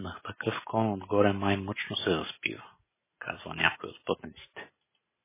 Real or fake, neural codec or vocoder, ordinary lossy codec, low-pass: real; none; MP3, 16 kbps; 3.6 kHz